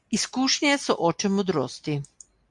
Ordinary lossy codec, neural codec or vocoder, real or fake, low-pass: MP3, 64 kbps; none; real; 10.8 kHz